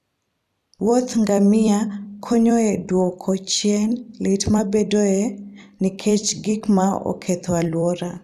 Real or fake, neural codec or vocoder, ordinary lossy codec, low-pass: fake; vocoder, 44.1 kHz, 128 mel bands every 256 samples, BigVGAN v2; none; 14.4 kHz